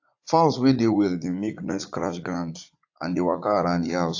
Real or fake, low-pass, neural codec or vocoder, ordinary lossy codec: fake; 7.2 kHz; vocoder, 44.1 kHz, 80 mel bands, Vocos; AAC, 48 kbps